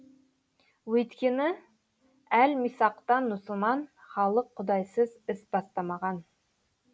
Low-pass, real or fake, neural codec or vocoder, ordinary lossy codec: none; real; none; none